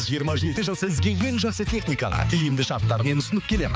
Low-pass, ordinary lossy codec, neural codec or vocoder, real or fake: none; none; codec, 16 kHz, 4 kbps, X-Codec, HuBERT features, trained on balanced general audio; fake